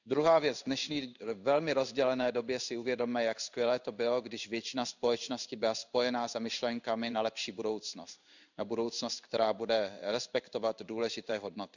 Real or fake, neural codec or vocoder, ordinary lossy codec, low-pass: fake; codec, 16 kHz in and 24 kHz out, 1 kbps, XY-Tokenizer; none; 7.2 kHz